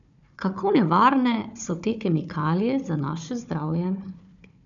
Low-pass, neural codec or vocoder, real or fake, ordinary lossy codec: 7.2 kHz; codec, 16 kHz, 4 kbps, FunCodec, trained on Chinese and English, 50 frames a second; fake; none